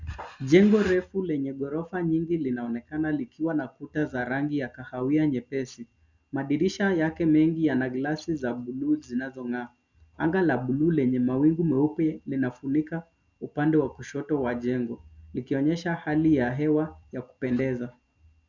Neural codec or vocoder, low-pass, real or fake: none; 7.2 kHz; real